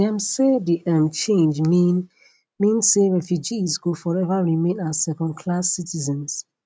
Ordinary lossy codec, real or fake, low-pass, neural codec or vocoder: none; real; none; none